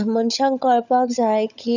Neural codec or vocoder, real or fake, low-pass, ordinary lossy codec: codec, 16 kHz, 16 kbps, FunCodec, trained on LibriTTS, 50 frames a second; fake; 7.2 kHz; none